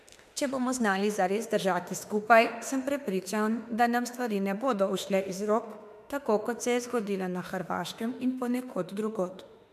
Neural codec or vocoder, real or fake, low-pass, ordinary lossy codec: autoencoder, 48 kHz, 32 numbers a frame, DAC-VAE, trained on Japanese speech; fake; 14.4 kHz; MP3, 96 kbps